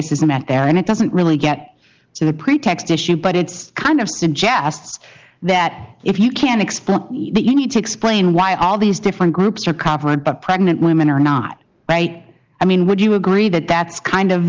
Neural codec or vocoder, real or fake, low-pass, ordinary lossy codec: none; real; 7.2 kHz; Opus, 32 kbps